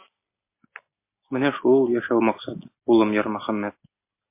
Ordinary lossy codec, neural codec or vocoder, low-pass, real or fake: MP3, 24 kbps; none; 3.6 kHz; real